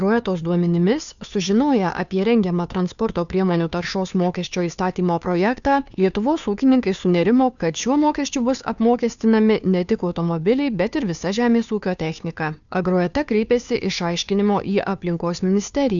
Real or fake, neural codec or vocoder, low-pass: fake; codec, 16 kHz, 2 kbps, FunCodec, trained on Chinese and English, 25 frames a second; 7.2 kHz